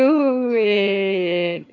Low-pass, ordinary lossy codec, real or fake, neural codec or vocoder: 7.2 kHz; MP3, 64 kbps; fake; vocoder, 22.05 kHz, 80 mel bands, HiFi-GAN